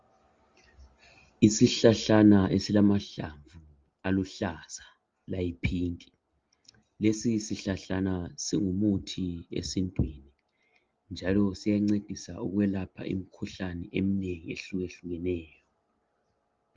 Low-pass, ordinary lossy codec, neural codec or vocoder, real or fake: 7.2 kHz; Opus, 32 kbps; none; real